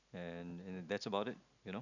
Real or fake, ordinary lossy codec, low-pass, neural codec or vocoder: real; none; 7.2 kHz; none